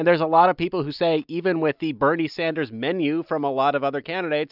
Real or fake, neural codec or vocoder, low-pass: real; none; 5.4 kHz